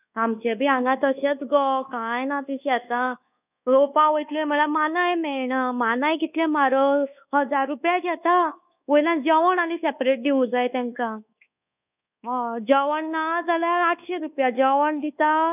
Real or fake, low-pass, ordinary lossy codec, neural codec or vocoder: fake; 3.6 kHz; none; codec, 24 kHz, 1.2 kbps, DualCodec